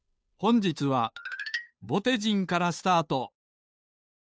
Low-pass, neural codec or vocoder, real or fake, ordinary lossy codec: none; codec, 16 kHz, 2 kbps, FunCodec, trained on Chinese and English, 25 frames a second; fake; none